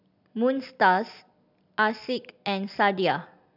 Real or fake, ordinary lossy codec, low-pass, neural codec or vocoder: real; none; 5.4 kHz; none